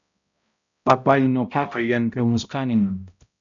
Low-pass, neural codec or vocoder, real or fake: 7.2 kHz; codec, 16 kHz, 0.5 kbps, X-Codec, HuBERT features, trained on balanced general audio; fake